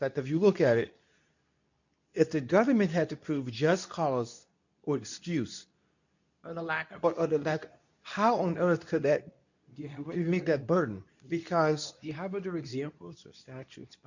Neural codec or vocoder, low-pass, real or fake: codec, 24 kHz, 0.9 kbps, WavTokenizer, medium speech release version 2; 7.2 kHz; fake